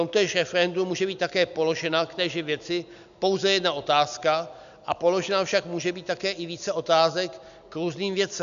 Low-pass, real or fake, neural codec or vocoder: 7.2 kHz; real; none